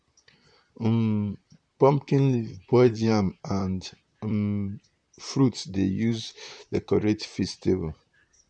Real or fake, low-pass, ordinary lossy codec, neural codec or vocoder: fake; 9.9 kHz; none; vocoder, 44.1 kHz, 128 mel bands, Pupu-Vocoder